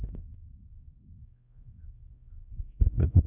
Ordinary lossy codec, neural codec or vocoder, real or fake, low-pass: none; codec, 16 kHz, 1 kbps, X-Codec, WavLM features, trained on Multilingual LibriSpeech; fake; 3.6 kHz